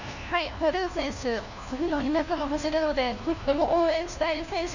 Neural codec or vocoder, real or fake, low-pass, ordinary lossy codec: codec, 16 kHz, 1 kbps, FunCodec, trained on LibriTTS, 50 frames a second; fake; 7.2 kHz; none